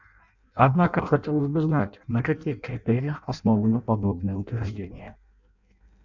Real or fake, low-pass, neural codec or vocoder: fake; 7.2 kHz; codec, 16 kHz in and 24 kHz out, 0.6 kbps, FireRedTTS-2 codec